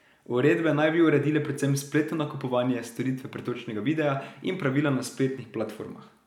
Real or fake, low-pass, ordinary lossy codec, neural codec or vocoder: real; 19.8 kHz; none; none